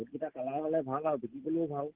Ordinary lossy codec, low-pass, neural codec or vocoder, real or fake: Opus, 16 kbps; 3.6 kHz; none; real